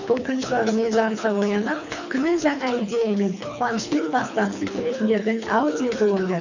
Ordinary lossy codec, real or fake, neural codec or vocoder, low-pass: none; fake; codec, 24 kHz, 3 kbps, HILCodec; 7.2 kHz